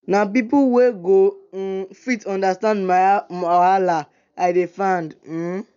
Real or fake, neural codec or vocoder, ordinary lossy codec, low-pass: real; none; none; 7.2 kHz